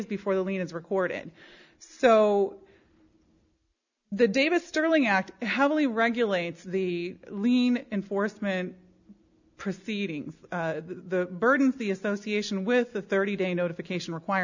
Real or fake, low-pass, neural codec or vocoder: real; 7.2 kHz; none